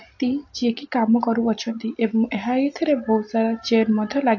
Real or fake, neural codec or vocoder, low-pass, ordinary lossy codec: real; none; 7.2 kHz; AAC, 48 kbps